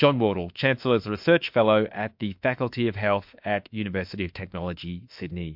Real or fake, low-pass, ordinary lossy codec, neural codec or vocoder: fake; 5.4 kHz; MP3, 48 kbps; autoencoder, 48 kHz, 32 numbers a frame, DAC-VAE, trained on Japanese speech